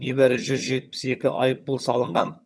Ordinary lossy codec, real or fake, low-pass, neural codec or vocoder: none; fake; none; vocoder, 22.05 kHz, 80 mel bands, HiFi-GAN